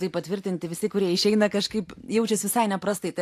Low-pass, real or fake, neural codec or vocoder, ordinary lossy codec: 14.4 kHz; fake; vocoder, 44.1 kHz, 128 mel bands every 512 samples, BigVGAN v2; AAC, 64 kbps